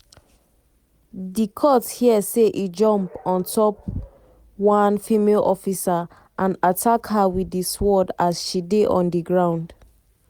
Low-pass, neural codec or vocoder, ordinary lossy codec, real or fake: 19.8 kHz; none; Opus, 64 kbps; real